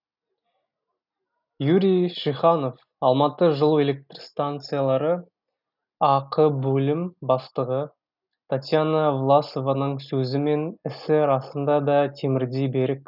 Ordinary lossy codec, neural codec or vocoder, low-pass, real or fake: none; none; 5.4 kHz; real